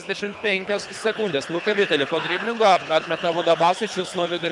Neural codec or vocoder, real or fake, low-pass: codec, 24 kHz, 3 kbps, HILCodec; fake; 10.8 kHz